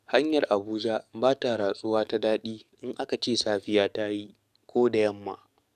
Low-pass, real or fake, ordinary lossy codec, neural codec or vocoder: 14.4 kHz; fake; none; codec, 44.1 kHz, 7.8 kbps, DAC